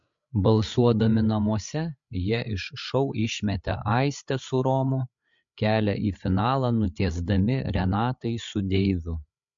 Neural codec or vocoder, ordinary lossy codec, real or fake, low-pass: codec, 16 kHz, 8 kbps, FreqCodec, larger model; MP3, 48 kbps; fake; 7.2 kHz